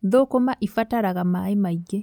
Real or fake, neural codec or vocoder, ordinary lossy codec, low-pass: real; none; none; 19.8 kHz